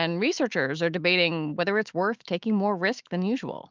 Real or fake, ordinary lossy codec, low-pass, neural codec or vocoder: fake; Opus, 32 kbps; 7.2 kHz; autoencoder, 48 kHz, 128 numbers a frame, DAC-VAE, trained on Japanese speech